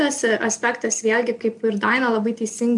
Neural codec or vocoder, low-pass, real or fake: none; 10.8 kHz; real